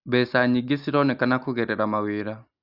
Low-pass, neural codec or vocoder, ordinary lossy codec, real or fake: 5.4 kHz; none; Opus, 64 kbps; real